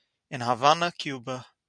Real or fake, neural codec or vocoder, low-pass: real; none; 9.9 kHz